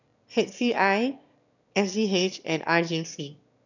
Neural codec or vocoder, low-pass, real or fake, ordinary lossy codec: autoencoder, 22.05 kHz, a latent of 192 numbers a frame, VITS, trained on one speaker; 7.2 kHz; fake; none